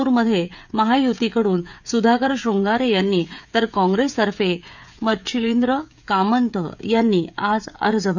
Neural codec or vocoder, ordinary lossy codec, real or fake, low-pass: codec, 16 kHz, 16 kbps, FreqCodec, smaller model; none; fake; 7.2 kHz